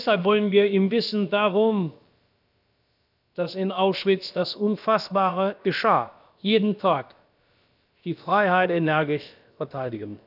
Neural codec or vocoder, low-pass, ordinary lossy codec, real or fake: codec, 16 kHz, about 1 kbps, DyCAST, with the encoder's durations; 5.4 kHz; none; fake